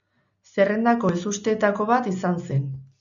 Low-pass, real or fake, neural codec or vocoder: 7.2 kHz; real; none